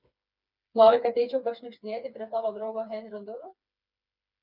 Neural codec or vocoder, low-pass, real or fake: codec, 16 kHz, 4 kbps, FreqCodec, smaller model; 5.4 kHz; fake